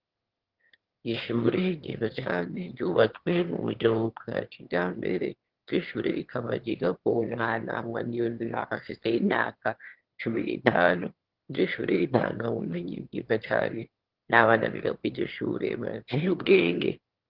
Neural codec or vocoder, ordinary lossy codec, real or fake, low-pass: autoencoder, 22.05 kHz, a latent of 192 numbers a frame, VITS, trained on one speaker; Opus, 16 kbps; fake; 5.4 kHz